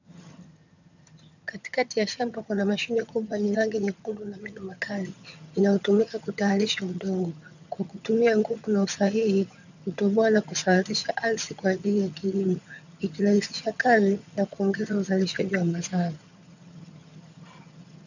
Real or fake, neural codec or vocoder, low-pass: fake; vocoder, 22.05 kHz, 80 mel bands, HiFi-GAN; 7.2 kHz